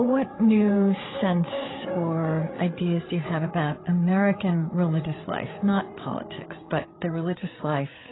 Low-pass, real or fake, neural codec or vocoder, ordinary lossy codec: 7.2 kHz; fake; codec, 16 kHz, 8 kbps, FreqCodec, larger model; AAC, 16 kbps